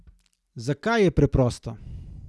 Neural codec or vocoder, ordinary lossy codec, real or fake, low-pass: none; none; real; none